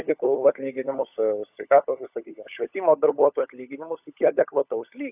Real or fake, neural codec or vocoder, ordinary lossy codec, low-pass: fake; codec, 16 kHz, 16 kbps, FunCodec, trained on LibriTTS, 50 frames a second; AAC, 32 kbps; 3.6 kHz